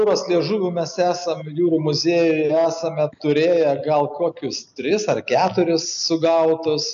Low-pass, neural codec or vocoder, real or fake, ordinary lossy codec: 7.2 kHz; none; real; MP3, 96 kbps